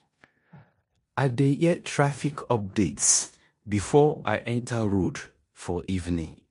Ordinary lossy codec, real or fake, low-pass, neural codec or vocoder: MP3, 48 kbps; fake; 10.8 kHz; codec, 16 kHz in and 24 kHz out, 0.9 kbps, LongCat-Audio-Codec, four codebook decoder